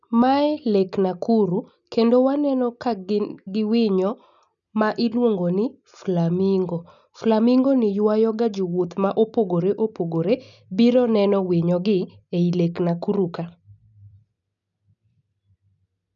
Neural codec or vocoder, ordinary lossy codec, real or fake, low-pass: none; none; real; 7.2 kHz